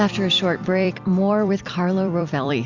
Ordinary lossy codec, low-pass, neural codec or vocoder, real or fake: Opus, 64 kbps; 7.2 kHz; vocoder, 44.1 kHz, 128 mel bands every 256 samples, BigVGAN v2; fake